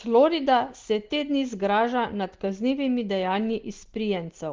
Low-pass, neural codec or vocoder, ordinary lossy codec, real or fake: 7.2 kHz; none; Opus, 16 kbps; real